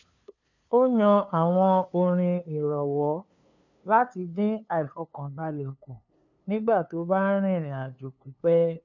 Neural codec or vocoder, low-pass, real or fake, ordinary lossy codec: codec, 16 kHz, 2 kbps, FunCodec, trained on LibriTTS, 25 frames a second; 7.2 kHz; fake; none